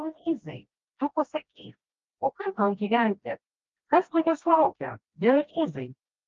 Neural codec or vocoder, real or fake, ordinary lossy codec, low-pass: codec, 16 kHz, 1 kbps, FreqCodec, smaller model; fake; Opus, 32 kbps; 7.2 kHz